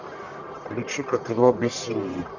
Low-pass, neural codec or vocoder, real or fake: 7.2 kHz; codec, 44.1 kHz, 1.7 kbps, Pupu-Codec; fake